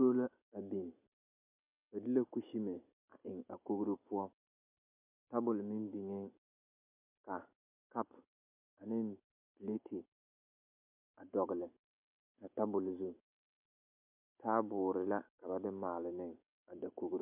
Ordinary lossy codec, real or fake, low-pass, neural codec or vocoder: MP3, 32 kbps; real; 3.6 kHz; none